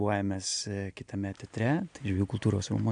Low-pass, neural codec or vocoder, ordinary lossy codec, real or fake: 9.9 kHz; none; MP3, 96 kbps; real